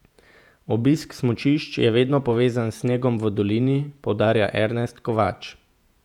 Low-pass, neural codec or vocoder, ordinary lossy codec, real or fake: 19.8 kHz; vocoder, 48 kHz, 128 mel bands, Vocos; none; fake